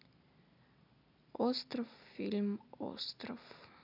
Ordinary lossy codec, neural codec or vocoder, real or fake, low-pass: none; none; real; 5.4 kHz